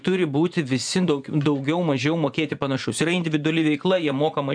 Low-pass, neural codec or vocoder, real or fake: 9.9 kHz; vocoder, 24 kHz, 100 mel bands, Vocos; fake